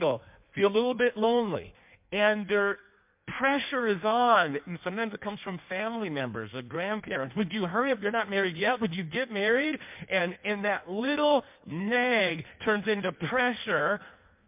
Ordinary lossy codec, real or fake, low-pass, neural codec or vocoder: MP3, 32 kbps; fake; 3.6 kHz; codec, 16 kHz in and 24 kHz out, 1.1 kbps, FireRedTTS-2 codec